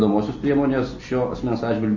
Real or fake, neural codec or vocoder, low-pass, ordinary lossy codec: real; none; 7.2 kHz; MP3, 32 kbps